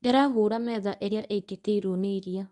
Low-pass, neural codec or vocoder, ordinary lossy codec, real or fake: 10.8 kHz; codec, 24 kHz, 0.9 kbps, WavTokenizer, medium speech release version 1; none; fake